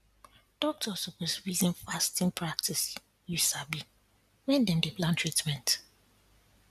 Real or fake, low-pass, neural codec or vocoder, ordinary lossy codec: real; 14.4 kHz; none; none